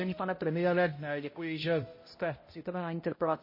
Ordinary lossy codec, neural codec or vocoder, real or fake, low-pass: MP3, 24 kbps; codec, 16 kHz, 0.5 kbps, X-Codec, HuBERT features, trained on balanced general audio; fake; 5.4 kHz